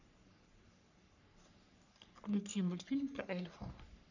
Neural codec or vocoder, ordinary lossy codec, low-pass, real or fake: codec, 44.1 kHz, 3.4 kbps, Pupu-Codec; none; 7.2 kHz; fake